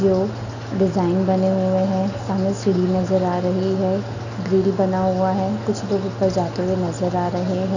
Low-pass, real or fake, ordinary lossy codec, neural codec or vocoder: 7.2 kHz; real; none; none